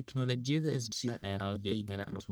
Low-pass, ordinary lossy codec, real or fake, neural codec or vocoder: none; none; fake; codec, 44.1 kHz, 1.7 kbps, Pupu-Codec